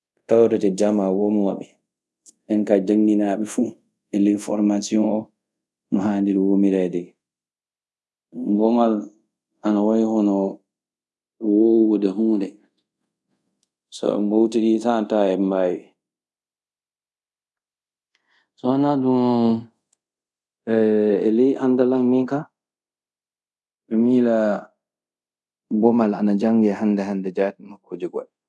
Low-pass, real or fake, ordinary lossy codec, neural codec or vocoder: none; fake; none; codec, 24 kHz, 0.5 kbps, DualCodec